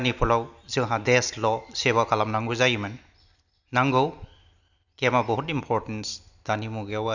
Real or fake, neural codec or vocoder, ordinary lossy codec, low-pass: real; none; none; 7.2 kHz